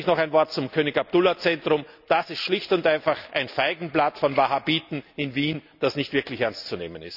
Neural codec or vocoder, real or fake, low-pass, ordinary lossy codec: none; real; 5.4 kHz; none